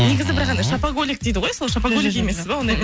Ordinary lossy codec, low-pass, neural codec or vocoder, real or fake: none; none; none; real